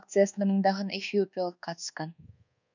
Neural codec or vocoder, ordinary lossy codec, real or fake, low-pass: codec, 24 kHz, 1.2 kbps, DualCodec; none; fake; 7.2 kHz